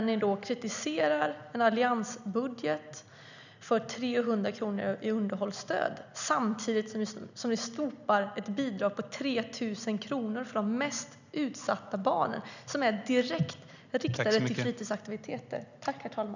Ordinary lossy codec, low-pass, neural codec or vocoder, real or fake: none; 7.2 kHz; none; real